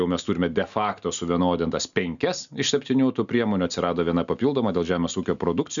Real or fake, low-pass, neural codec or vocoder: real; 7.2 kHz; none